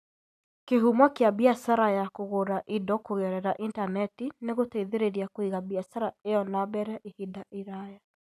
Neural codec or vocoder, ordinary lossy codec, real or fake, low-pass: none; none; real; 14.4 kHz